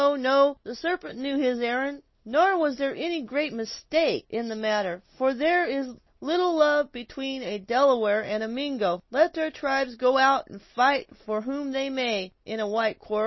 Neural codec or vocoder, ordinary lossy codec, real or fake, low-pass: none; MP3, 24 kbps; real; 7.2 kHz